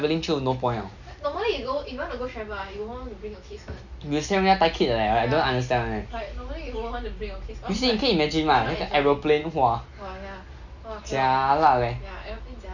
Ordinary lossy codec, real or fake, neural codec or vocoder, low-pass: none; real; none; 7.2 kHz